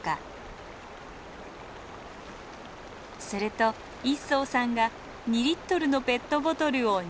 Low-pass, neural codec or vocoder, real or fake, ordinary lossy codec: none; none; real; none